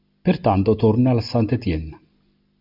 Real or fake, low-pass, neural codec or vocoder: real; 5.4 kHz; none